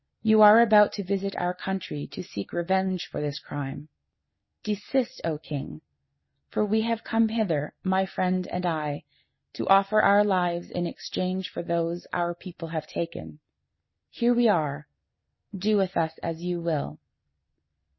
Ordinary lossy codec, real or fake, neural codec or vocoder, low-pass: MP3, 24 kbps; real; none; 7.2 kHz